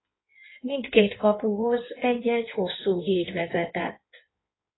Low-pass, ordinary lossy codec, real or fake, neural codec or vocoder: 7.2 kHz; AAC, 16 kbps; fake; codec, 16 kHz in and 24 kHz out, 1.1 kbps, FireRedTTS-2 codec